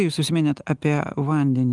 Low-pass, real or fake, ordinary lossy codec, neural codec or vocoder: 10.8 kHz; real; Opus, 24 kbps; none